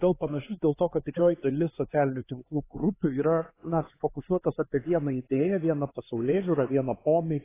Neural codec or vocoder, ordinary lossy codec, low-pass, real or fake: codec, 16 kHz, 4 kbps, X-Codec, HuBERT features, trained on LibriSpeech; AAC, 16 kbps; 3.6 kHz; fake